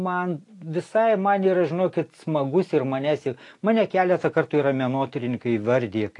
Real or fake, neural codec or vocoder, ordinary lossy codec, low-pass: real; none; AAC, 48 kbps; 10.8 kHz